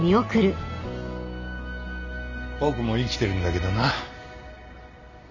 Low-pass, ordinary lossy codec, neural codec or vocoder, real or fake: 7.2 kHz; none; none; real